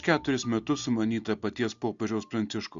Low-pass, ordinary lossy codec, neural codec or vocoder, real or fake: 7.2 kHz; Opus, 64 kbps; none; real